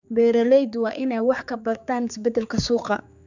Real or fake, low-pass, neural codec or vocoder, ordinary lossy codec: fake; 7.2 kHz; codec, 16 kHz, 4 kbps, X-Codec, HuBERT features, trained on general audio; none